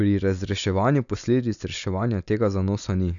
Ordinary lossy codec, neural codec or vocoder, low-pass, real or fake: none; none; 7.2 kHz; real